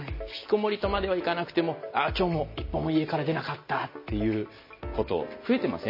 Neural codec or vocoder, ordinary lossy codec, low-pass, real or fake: none; MP3, 24 kbps; 5.4 kHz; real